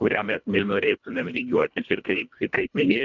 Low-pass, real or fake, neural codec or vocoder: 7.2 kHz; fake; codec, 24 kHz, 1.5 kbps, HILCodec